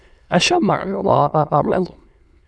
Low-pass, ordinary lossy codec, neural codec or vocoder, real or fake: none; none; autoencoder, 22.05 kHz, a latent of 192 numbers a frame, VITS, trained on many speakers; fake